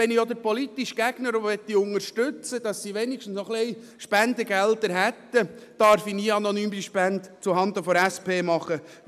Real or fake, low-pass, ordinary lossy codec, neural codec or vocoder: real; 14.4 kHz; MP3, 96 kbps; none